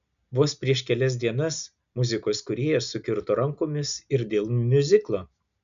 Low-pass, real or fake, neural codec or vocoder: 7.2 kHz; real; none